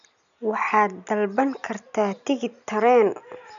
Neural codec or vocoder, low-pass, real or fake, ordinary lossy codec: none; 7.2 kHz; real; none